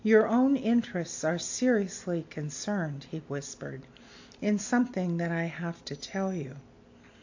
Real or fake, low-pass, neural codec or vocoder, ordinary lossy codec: real; 7.2 kHz; none; AAC, 48 kbps